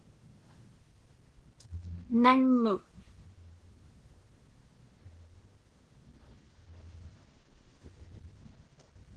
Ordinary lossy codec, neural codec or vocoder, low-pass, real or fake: Opus, 16 kbps; codec, 16 kHz in and 24 kHz out, 0.9 kbps, LongCat-Audio-Codec, fine tuned four codebook decoder; 10.8 kHz; fake